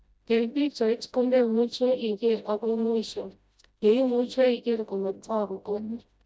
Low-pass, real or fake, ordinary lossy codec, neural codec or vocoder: none; fake; none; codec, 16 kHz, 0.5 kbps, FreqCodec, smaller model